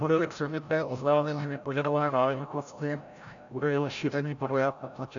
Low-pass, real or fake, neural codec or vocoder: 7.2 kHz; fake; codec, 16 kHz, 0.5 kbps, FreqCodec, larger model